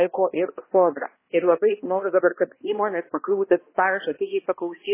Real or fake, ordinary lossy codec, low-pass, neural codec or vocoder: fake; MP3, 16 kbps; 3.6 kHz; codec, 16 kHz, 1 kbps, X-Codec, HuBERT features, trained on balanced general audio